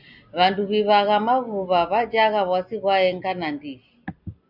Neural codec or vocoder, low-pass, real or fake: none; 5.4 kHz; real